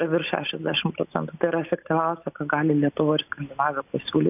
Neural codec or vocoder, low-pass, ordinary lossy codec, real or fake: none; 3.6 kHz; AAC, 32 kbps; real